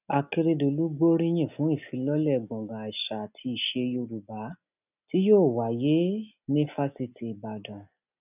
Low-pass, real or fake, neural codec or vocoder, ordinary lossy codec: 3.6 kHz; real; none; none